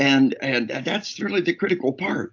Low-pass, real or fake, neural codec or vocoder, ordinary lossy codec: 7.2 kHz; real; none; AAC, 48 kbps